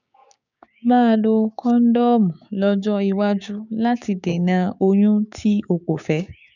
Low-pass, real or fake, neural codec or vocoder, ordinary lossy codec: 7.2 kHz; fake; codec, 16 kHz, 6 kbps, DAC; none